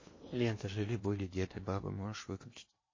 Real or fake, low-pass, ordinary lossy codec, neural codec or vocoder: fake; 7.2 kHz; MP3, 32 kbps; codec, 16 kHz in and 24 kHz out, 0.8 kbps, FocalCodec, streaming, 65536 codes